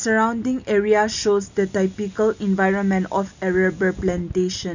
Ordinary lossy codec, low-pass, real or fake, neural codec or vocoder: none; 7.2 kHz; real; none